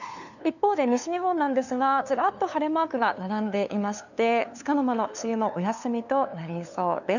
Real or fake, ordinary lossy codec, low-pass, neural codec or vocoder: fake; none; 7.2 kHz; codec, 16 kHz, 2 kbps, FunCodec, trained on LibriTTS, 25 frames a second